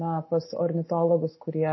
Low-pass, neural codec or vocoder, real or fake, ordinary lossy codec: 7.2 kHz; none; real; MP3, 24 kbps